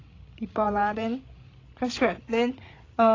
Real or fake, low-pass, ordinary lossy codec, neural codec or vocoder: fake; 7.2 kHz; AAC, 32 kbps; codec, 16 kHz, 16 kbps, FreqCodec, larger model